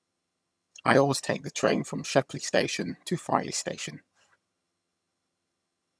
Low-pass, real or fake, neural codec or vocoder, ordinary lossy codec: none; fake; vocoder, 22.05 kHz, 80 mel bands, HiFi-GAN; none